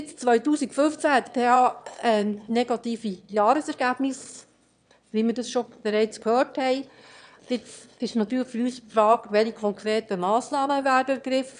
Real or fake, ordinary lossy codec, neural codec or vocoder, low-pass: fake; none; autoencoder, 22.05 kHz, a latent of 192 numbers a frame, VITS, trained on one speaker; 9.9 kHz